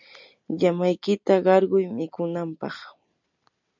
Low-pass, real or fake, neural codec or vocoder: 7.2 kHz; real; none